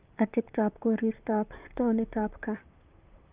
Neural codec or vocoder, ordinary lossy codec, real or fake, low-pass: vocoder, 44.1 kHz, 128 mel bands, Pupu-Vocoder; Opus, 24 kbps; fake; 3.6 kHz